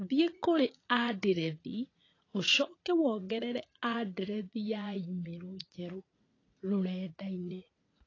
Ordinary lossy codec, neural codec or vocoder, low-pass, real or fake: AAC, 32 kbps; vocoder, 44.1 kHz, 80 mel bands, Vocos; 7.2 kHz; fake